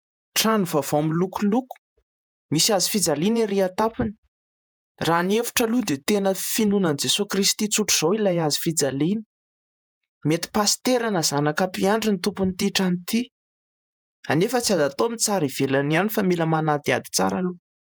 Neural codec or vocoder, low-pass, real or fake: vocoder, 48 kHz, 128 mel bands, Vocos; 19.8 kHz; fake